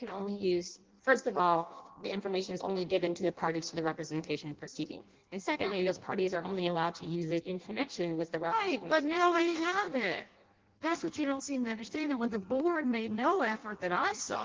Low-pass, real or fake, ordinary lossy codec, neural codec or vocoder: 7.2 kHz; fake; Opus, 16 kbps; codec, 16 kHz in and 24 kHz out, 0.6 kbps, FireRedTTS-2 codec